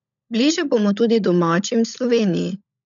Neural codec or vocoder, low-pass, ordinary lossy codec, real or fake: codec, 16 kHz, 16 kbps, FunCodec, trained on LibriTTS, 50 frames a second; 7.2 kHz; none; fake